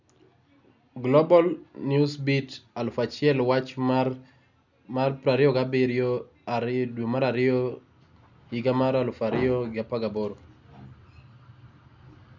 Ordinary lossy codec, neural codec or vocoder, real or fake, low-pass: none; none; real; 7.2 kHz